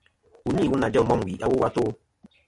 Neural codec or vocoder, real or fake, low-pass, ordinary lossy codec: none; real; 10.8 kHz; MP3, 48 kbps